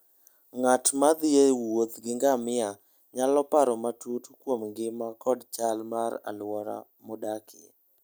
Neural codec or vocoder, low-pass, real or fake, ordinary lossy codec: none; none; real; none